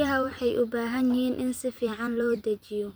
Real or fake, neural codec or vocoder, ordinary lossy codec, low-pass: fake; vocoder, 44.1 kHz, 128 mel bands every 256 samples, BigVGAN v2; none; none